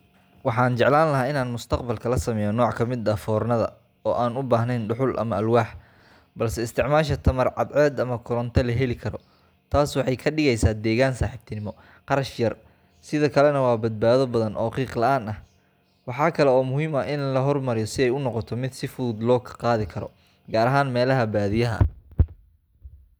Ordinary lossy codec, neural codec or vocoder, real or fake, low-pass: none; none; real; none